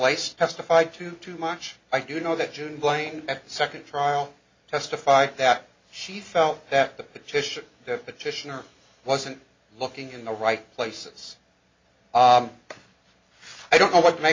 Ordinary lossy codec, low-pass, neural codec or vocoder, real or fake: MP3, 32 kbps; 7.2 kHz; none; real